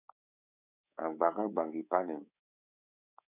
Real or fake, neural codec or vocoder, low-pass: fake; codec, 24 kHz, 3.1 kbps, DualCodec; 3.6 kHz